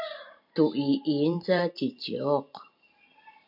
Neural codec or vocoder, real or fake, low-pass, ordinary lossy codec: vocoder, 44.1 kHz, 128 mel bands every 512 samples, BigVGAN v2; fake; 5.4 kHz; MP3, 48 kbps